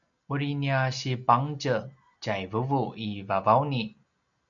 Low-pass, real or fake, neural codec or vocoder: 7.2 kHz; real; none